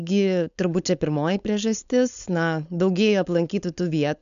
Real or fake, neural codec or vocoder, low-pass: fake; codec, 16 kHz, 4.8 kbps, FACodec; 7.2 kHz